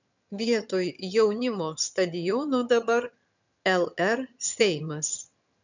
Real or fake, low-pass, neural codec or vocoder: fake; 7.2 kHz; vocoder, 22.05 kHz, 80 mel bands, HiFi-GAN